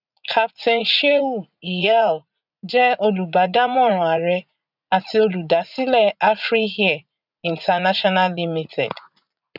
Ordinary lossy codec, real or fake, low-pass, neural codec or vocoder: none; fake; 5.4 kHz; vocoder, 44.1 kHz, 80 mel bands, Vocos